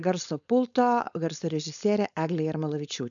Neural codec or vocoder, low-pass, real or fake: codec, 16 kHz, 4.8 kbps, FACodec; 7.2 kHz; fake